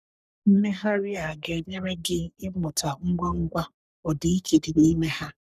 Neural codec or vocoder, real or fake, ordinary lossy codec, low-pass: codec, 44.1 kHz, 3.4 kbps, Pupu-Codec; fake; none; 14.4 kHz